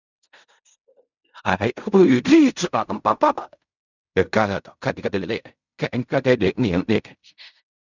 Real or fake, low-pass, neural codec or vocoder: fake; 7.2 kHz; codec, 16 kHz in and 24 kHz out, 0.4 kbps, LongCat-Audio-Codec, fine tuned four codebook decoder